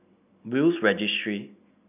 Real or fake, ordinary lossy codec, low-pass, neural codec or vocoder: real; none; 3.6 kHz; none